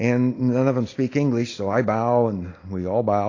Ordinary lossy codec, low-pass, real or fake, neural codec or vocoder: AAC, 32 kbps; 7.2 kHz; real; none